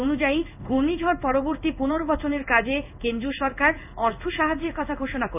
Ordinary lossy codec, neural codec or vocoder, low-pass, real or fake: none; codec, 16 kHz in and 24 kHz out, 1 kbps, XY-Tokenizer; 3.6 kHz; fake